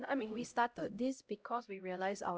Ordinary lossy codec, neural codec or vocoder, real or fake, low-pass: none; codec, 16 kHz, 0.5 kbps, X-Codec, HuBERT features, trained on LibriSpeech; fake; none